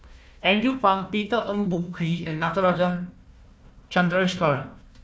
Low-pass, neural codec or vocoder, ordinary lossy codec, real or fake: none; codec, 16 kHz, 1 kbps, FunCodec, trained on Chinese and English, 50 frames a second; none; fake